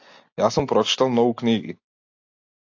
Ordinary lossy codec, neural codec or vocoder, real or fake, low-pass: AAC, 48 kbps; none; real; 7.2 kHz